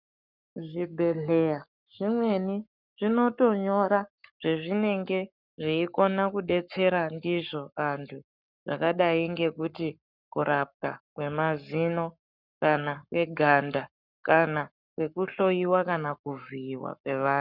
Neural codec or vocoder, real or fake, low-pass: codec, 44.1 kHz, 7.8 kbps, Pupu-Codec; fake; 5.4 kHz